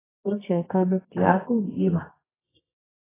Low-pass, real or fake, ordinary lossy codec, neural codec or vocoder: 3.6 kHz; fake; AAC, 16 kbps; codec, 24 kHz, 0.9 kbps, WavTokenizer, medium music audio release